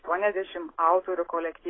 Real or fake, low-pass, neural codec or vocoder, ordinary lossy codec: real; 7.2 kHz; none; AAC, 16 kbps